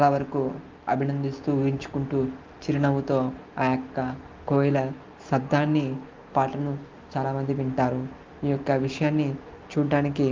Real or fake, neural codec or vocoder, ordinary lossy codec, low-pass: real; none; Opus, 24 kbps; 7.2 kHz